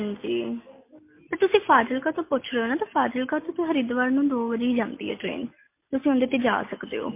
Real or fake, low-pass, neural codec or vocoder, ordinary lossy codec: real; 3.6 kHz; none; MP3, 24 kbps